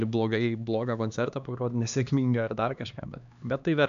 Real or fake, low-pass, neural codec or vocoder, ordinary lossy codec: fake; 7.2 kHz; codec, 16 kHz, 2 kbps, X-Codec, HuBERT features, trained on LibriSpeech; AAC, 96 kbps